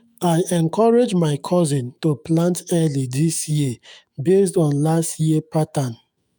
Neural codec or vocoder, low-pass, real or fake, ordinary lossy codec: autoencoder, 48 kHz, 128 numbers a frame, DAC-VAE, trained on Japanese speech; none; fake; none